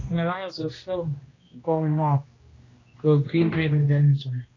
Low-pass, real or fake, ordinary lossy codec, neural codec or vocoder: 7.2 kHz; fake; AAC, 32 kbps; codec, 16 kHz, 1 kbps, X-Codec, HuBERT features, trained on general audio